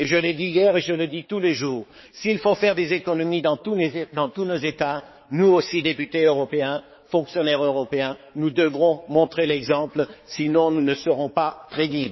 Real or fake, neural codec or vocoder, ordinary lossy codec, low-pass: fake; codec, 16 kHz, 4 kbps, X-Codec, HuBERT features, trained on balanced general audio; MP3, 24 kbps; 7.2 kHz